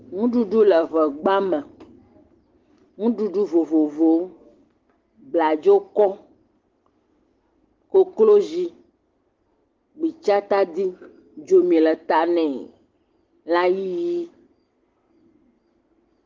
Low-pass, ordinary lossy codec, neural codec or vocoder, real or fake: 7.2 kHz; Opus, 16 kbps; none; real